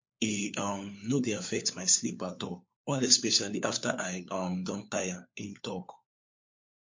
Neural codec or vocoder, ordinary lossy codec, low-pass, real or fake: codec, 16 kHz, 4 kbps, FunCodec, trained on LibriTTS, 50 frames a second; MP3, 48 kbps; 7.2 kHz; fake